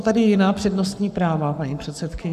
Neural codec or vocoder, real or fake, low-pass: codec, 44.1 kHz, 7.8 kbps, Pupu-Codec; fake; 14.4 kHz